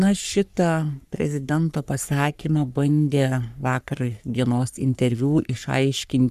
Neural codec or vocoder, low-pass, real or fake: codec, 44.1 kHz, 3.4 kbps, Pupu-Codec; 14.4 kHz; fake